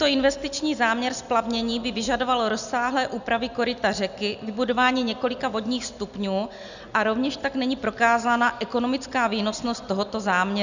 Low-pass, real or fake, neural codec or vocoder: 7.2 kHz; real; none